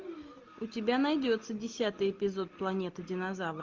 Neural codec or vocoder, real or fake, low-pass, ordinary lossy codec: none; real; 7.2 kHz; Opus, 24 kbps